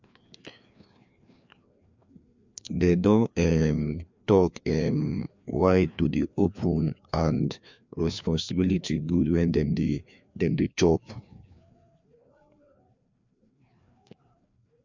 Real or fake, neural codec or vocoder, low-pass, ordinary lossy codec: fake; codec, 16 kHz, 2 kbps, FreqCodec, larger model; 7.2 kHz; MP3, 64 kbps